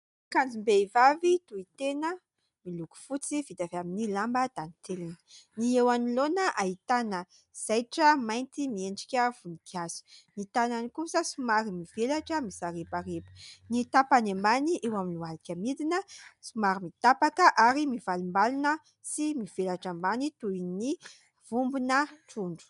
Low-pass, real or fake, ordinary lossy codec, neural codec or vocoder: 10.8 kHz; real; MP3, 96 kbps; none